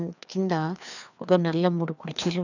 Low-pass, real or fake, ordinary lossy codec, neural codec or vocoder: 7.2 kHz; fake; none; codec, 16 kHz, 2 kbps, FreqCodec, larger model